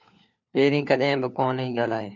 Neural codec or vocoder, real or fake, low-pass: codec, 16 kHz, 4 kbps, FunCodec, trained on LibriTTS, 50 frames a second; fake; 7.2 kHz